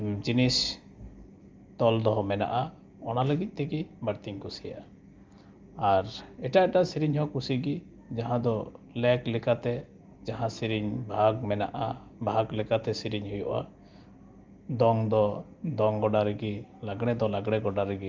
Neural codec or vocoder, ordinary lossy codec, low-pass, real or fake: none; Opus, 32 kbps; 7.2 kHz; real